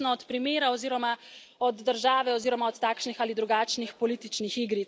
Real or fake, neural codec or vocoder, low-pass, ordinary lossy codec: real; none; none; none